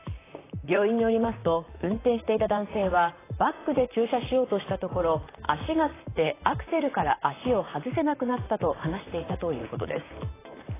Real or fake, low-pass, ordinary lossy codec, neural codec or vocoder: fake; 3.6 kHz; AAC, 16 kbps; vocoder, 44.1 kHz, 128 mel bands, Pupu-Vocoder